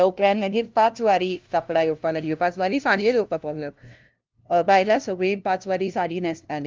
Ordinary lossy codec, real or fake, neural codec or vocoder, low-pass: Opus, 32 kbps; fake; codec, 16 kHz, 0.5 kbps, FunCodec, trained on LibriTTS, 25 frames a second; 7.2 kHz